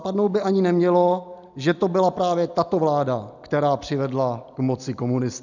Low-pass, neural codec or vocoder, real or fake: 7.2 kHz; none; real